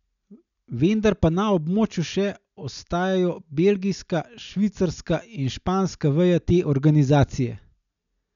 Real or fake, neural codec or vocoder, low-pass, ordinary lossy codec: real; none; 7.2 kHz; none